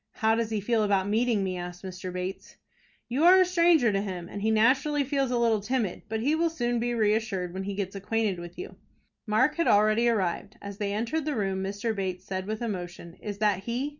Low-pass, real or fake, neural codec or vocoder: 7.2 kHz; real; none